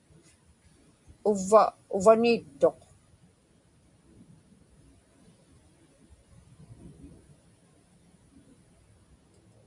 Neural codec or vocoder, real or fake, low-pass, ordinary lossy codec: none; real; 10.8 kHz; MP3, 64 kbps